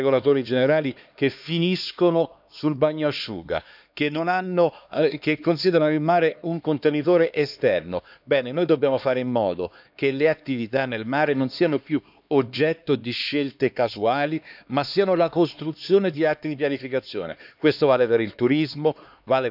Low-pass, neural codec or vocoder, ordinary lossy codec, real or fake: 5.4 kHz; codec, 16 kHz, 2 kbps, X-Codec, HuBERT features, trained on LibriSpeech; none; fake